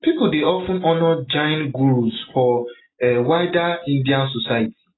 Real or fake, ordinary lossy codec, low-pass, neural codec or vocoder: real; AAC, 16 kbps; 7.2 kHz; none